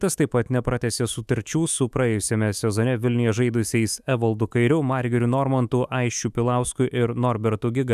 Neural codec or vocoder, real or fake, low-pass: autoencoder, 48 kHz, 128 numbers a frame, DAC-VAE, trained on Japanese speech; fake; 14.4 kHz